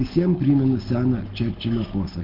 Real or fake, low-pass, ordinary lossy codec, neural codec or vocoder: real; 5.4 kHz; Opus, 16 kbps; none